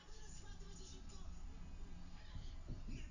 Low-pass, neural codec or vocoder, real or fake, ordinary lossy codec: 7.2 kHz; none; real; none